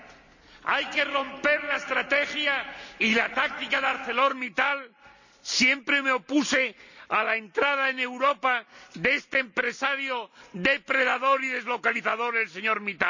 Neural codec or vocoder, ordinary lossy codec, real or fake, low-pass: none; none; real; 7.2 kHz